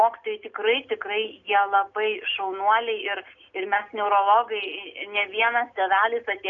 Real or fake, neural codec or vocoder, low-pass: real; none; 7.2 kHz